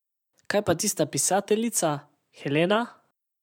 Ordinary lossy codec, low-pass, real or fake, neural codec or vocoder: none; 19.8 kHz; fake; vocoder, 44.1 kHz, 128 mel bands every 512 samples, BigVGAN v2